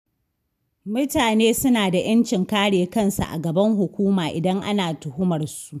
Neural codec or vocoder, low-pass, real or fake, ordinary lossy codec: none; 14.4 kHz; real; none